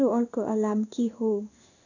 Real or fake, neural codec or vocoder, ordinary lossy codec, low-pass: fake; codec, 16 kHz in and 24 kHz out, 1 kbps, XY-Tokenizer; none; 7.2 kHz